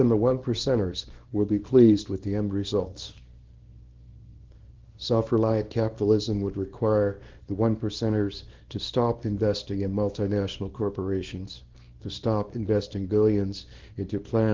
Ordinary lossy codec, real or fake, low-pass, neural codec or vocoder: Opus, 16 kbps; fake; 7.2 kHz; codec, 24 kHz, 0.9 kbps, WavTokenizer, small release